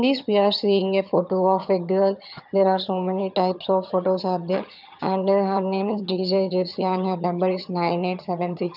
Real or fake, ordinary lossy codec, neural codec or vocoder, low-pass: fake; none; vocoder, 22.05 kHz, 80 mel bands, HiFi-GAN; 5.4 kHz